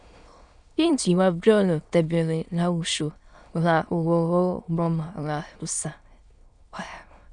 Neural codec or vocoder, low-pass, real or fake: autoencoder, 22.05 kHz, a latent of 192 numbers a frame, VITS, trained on many speakers; 9.9 kHz; fake